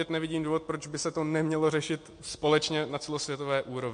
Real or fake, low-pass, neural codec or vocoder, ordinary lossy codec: real; 9.9 kHz; none; MP3, 48 kbps